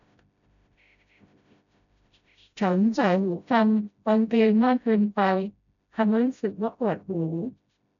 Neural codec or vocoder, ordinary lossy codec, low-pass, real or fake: codec, 16 kHz, 0.5 kbps, FreqCodec, smaller model; none; 7.2 kHz; fake